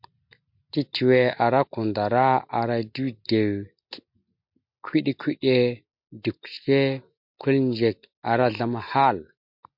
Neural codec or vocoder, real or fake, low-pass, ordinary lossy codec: none; real; 5.4 kHz; MP3, 48 kbps